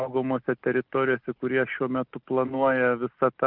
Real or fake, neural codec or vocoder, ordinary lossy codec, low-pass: real; none; Opus, 24 kbps; 5.4 kHz